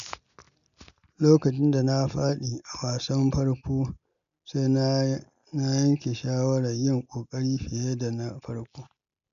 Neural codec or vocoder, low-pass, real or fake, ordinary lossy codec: none; 7.2 kHz; real; none